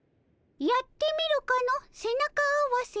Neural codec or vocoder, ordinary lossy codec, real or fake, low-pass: none; none; real; none